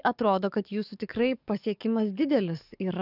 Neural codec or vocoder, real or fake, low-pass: codec, 44.1 kHz, 7.8 kbps, DAC; fake; 5.4 kHz